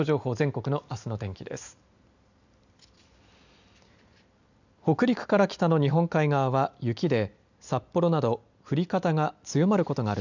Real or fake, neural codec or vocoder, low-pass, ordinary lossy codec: real; none; 7.2 kHz; none